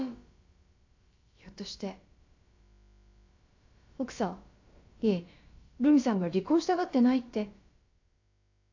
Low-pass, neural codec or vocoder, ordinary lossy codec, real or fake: 7.2 kHz; codec, 16 kHz, about 1 kbps, DyCAST, with the encoder's durations; AAC, 48 kbps; fake